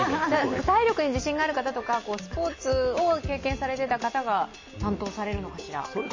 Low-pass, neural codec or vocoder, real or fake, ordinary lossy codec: 7.2 kHz; none; real; MP3, 32 kbps